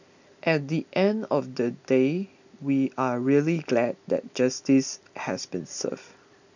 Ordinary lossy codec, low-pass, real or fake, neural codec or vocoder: none; 7.2 kHz; real; none